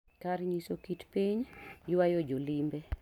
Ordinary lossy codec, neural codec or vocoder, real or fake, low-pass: none; none; real; 19.8 kHz